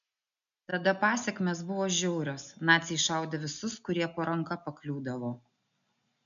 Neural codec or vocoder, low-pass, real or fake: none; 7.2 kHz; real